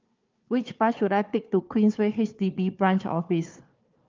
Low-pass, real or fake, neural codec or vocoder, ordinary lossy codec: 7.2 kHz; fake; codec, 16 kHz, 4 kbps, FunCodec, trained on Chinese and English, 50 frames a second; Opus, 24 kbps